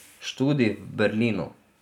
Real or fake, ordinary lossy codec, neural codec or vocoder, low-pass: real; none; none; 19.8 kHz